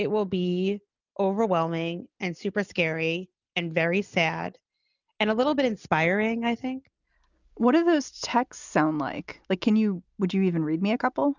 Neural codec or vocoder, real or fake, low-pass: none; real; 7.2 kHz